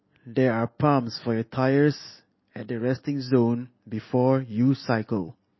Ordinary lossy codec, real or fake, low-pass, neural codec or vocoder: MP3, 24 kbps; real; 7.2 kHz; none